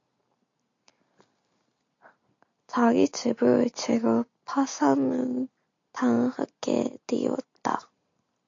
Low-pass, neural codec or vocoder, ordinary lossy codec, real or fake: 7.2 kHz; none; AAC, 48 kbps; real